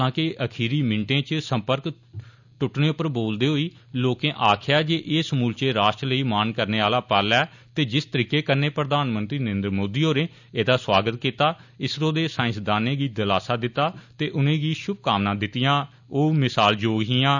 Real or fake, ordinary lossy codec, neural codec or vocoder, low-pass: real; none; none; 7.2 kHz